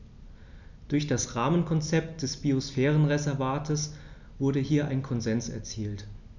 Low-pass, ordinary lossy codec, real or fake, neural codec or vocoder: 7.2 kHz; none; real; none